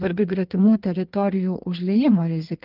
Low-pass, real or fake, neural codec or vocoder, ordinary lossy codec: 5.4 kHz; fake; codec, 16 kHz in and 24 kHz out, 1.1 kbps, FireRedTTS-2 codec; Opus, 32 kbps